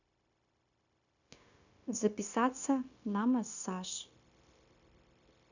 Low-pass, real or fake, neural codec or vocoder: 7.2 kHz; fake; codec, 16 kHz, 0.9 kbps, LongCat-Audio-Codec